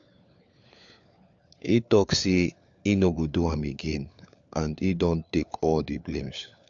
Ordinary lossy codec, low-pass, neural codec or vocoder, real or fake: AAC, 64 kbps; 7.2 kHz; codec, 16 kHz, 4 kbps, FunCodec, trained on LibriTTS, 50 frames a second; fake